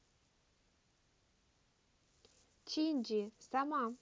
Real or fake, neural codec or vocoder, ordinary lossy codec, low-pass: real; none; none; none